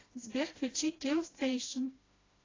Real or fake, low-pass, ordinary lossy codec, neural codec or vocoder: fake; 7.2 kHz; AAC, 32 kbps; codec, 16 kHz, 1 kbps, FreqCodec, smaller model